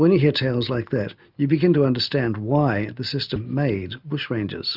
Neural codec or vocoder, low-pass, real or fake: none; 5.4 kHz; real